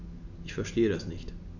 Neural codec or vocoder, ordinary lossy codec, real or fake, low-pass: none; none; real; 7.2 kHz